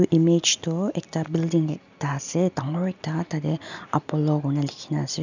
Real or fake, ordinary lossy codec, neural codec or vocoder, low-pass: real; none; none; 7.2 kHz